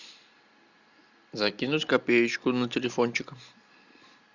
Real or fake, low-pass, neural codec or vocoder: real; 7.2 kHz; none